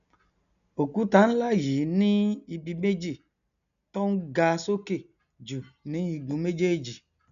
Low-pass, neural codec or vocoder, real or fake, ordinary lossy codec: 7.2 kHz; none; real; none